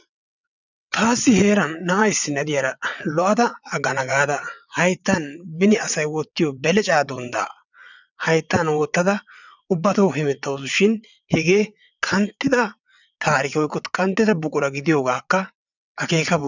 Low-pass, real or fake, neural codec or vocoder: 7.2 kHz; fake; vocoder, 22.05 kHz, 80 mel bands, WaveNeXt